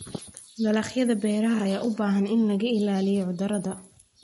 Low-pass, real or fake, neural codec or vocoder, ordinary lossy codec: 19.8 kHz; real; none; MP3, 48 kbps